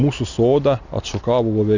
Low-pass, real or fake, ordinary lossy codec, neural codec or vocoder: 7.2 kHz; real; Opus, 64 kbps; none